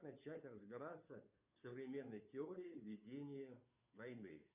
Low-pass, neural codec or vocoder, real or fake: 3.6 kHz; codec, 16 kHz, 2 kbps, FunCodec, trained on Chinese and English, 25 frames a second; fake